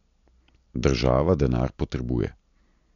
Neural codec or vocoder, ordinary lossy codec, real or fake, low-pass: none; MP3, 64 kbps; real; 7.2 kHz